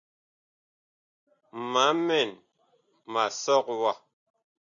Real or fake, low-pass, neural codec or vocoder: real; 7.2 kHz; none